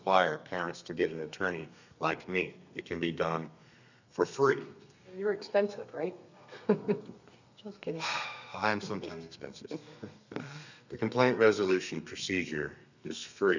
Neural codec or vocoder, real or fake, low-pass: codec, 32 kHz, 1.9 kbps, SNAC; fake; 7.2 kHz